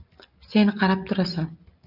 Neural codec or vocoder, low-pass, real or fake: none; 5.4 kHz; real